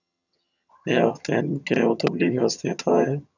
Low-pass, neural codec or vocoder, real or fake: 7.2 kHz; vocoder, 22.05 kHz, 80 mel bands, HiFi-GAN; fake